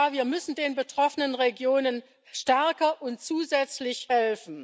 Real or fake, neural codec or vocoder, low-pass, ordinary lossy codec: real; none; none; none